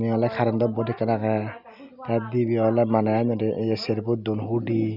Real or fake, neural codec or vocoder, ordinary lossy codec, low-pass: real; none; none; 5.4 kHz